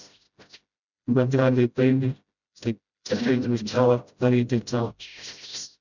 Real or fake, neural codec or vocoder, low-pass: fake; codec, 16 kHz, 0.5 kbps, FreqCodec, smaller model; 7.2 kHz